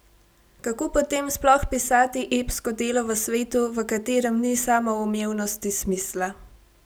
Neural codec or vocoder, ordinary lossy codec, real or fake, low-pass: none; none; real; none